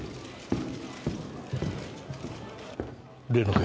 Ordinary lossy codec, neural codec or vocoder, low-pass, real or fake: none; none; none; real